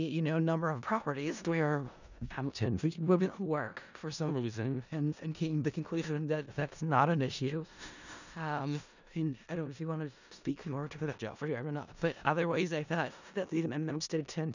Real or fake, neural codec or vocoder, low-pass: fake; codec, 16 kHz in and 24 kHz out, 0.4 kbps, LongCat-Audio-Codec, four codebook decoder; 7.2 kHz